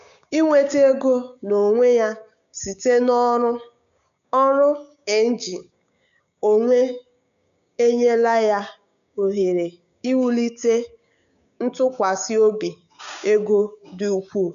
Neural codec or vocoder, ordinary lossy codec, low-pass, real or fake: codec, 16 kHz, 6 kbps, DAC; MP3, 96 kbps; 7.2 kHz; fake